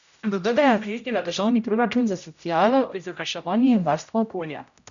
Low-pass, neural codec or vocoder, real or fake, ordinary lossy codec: 7.2 kHz; codec, 16 kHz, 0.5 kbps, X-Codec, HuBERT features, trained on general audio; fake; none